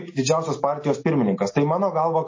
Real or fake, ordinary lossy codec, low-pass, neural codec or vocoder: real; MP3, 32 kbps; 7.2 kHz; none